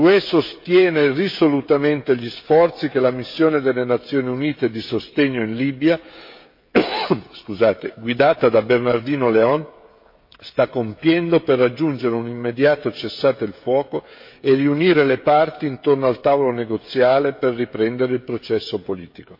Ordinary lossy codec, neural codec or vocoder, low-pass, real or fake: MP3, 32 kbps; codec, 16 kHz, 16 kbps, FreqCodec, smaller model; 5.4 kHz; fake